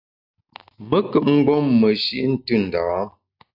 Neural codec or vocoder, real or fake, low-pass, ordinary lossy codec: none; real; 5.4 kHz; AAC, 48 kbps